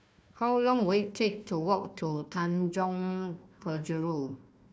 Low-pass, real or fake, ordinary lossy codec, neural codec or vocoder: none; fake; none; codec, 16 kHz, 1 kbps, FunCodec, trained on Chinese and English, 50 frames a second